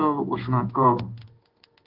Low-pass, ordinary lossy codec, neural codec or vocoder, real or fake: 5.4 kHz; Opus, 16 kbps; codec, 16 kHz in and 24 kHz out, 1 kbps, XY-Tokenizer; fake